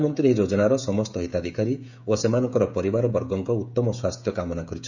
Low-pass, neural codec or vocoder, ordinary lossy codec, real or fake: 7.2 kHz; codec, 16 kHz, 16 kbps, FreqCodec, smaller model; none; fake